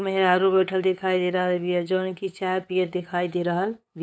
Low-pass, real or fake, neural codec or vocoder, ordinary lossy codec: none; fake; codec, 16 kHz, 8 kbps, FunCodec, trained on LibriTTS, 25 frames a second; none